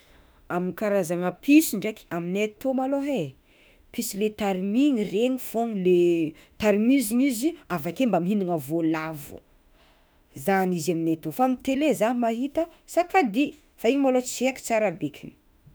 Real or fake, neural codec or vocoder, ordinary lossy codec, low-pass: fake; autoencoder, 48 kHz, 32 numbers a frame, DAC-VAE, trained on Japanese speech; none; none